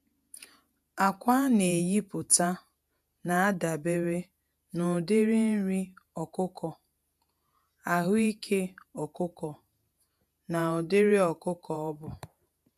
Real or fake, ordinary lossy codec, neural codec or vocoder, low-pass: fake; none; vocoder, 48 kHz, 128 mel bands, Vocos; 14.4 kHz